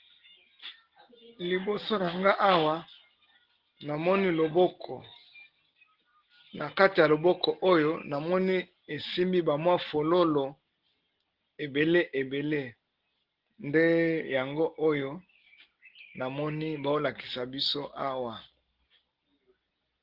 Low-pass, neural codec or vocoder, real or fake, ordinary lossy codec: 5.4 kHz; none; real; Opus, 16 kbps